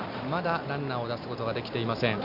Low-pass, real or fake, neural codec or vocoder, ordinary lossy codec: 5.4 kHz; real; none; none